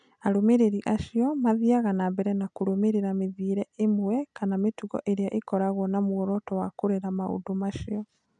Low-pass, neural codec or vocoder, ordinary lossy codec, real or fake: 9.9 kHz; none; none; real